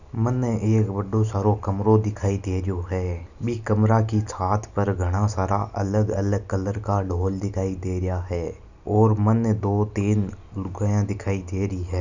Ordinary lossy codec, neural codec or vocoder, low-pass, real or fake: none; none; 7.2 kHz; real